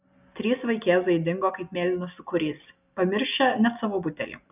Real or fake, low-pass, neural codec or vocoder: real; 3.6 kHz; none